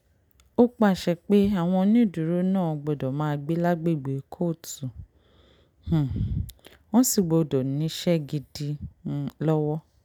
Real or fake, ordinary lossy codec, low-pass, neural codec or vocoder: real; none; 19.8 kHz; none